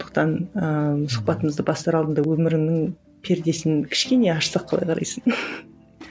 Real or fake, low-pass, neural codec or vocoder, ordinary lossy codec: real; none; none; none